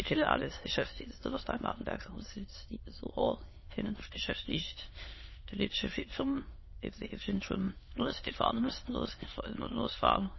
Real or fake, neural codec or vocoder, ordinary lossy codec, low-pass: fake; autoencoder, 22.05 kHz, a latent of 192 numbers a frame, VITS, trained on many speakers; MP3, 24 kbps; 7.2 kHz